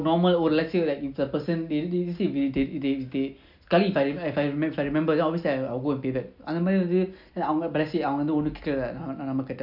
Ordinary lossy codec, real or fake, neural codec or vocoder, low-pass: none; real; none; 5.4 kHz